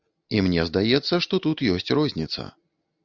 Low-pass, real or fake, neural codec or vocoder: 7.2 kHz; real; none